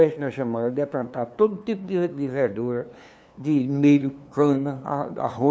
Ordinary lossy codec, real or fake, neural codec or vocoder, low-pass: none; fake; codec, 16 kHz, 2 kbps, FunCodec, trained on LibriTTS, 25 frames a second; none